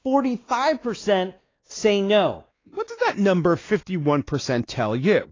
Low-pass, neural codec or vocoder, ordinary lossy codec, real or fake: 7.2 kHz; codec, 16 kHz, 2 kbps, X-Codec, WavLM features, trained on Multilingual LibriSpeech; AAC, 32 kbps; fake